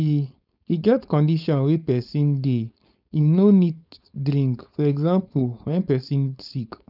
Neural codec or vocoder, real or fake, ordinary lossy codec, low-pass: codec, 16 kHz, 4.8 kbps, FACodec; fake; none; 5.4 kHz